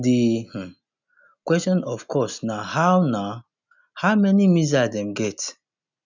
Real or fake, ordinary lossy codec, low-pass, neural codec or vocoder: real; none; 7.2 kHz; none